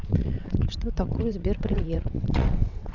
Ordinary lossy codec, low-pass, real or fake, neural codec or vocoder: none; 7.2 kHz; fake; vocoder, 22.05 kHz, 80 mel bands, WaveNeXt